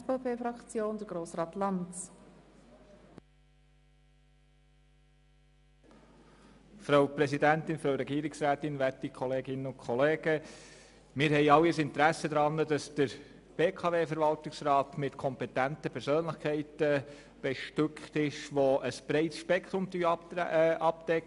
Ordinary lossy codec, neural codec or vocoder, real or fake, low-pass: none; none; real; 10.8 kHz